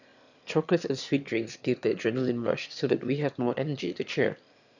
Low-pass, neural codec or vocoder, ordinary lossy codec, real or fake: 7.2 kHz; autoencoder, 22.05 kHz, a latent of 192 numbers a frame, VITS, trained on one speaker; none; fake